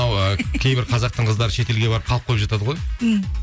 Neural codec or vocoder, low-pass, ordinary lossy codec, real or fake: none; none; none; real